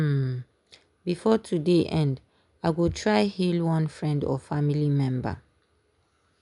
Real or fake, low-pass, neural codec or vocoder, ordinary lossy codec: real; 10.8 kHz; none; none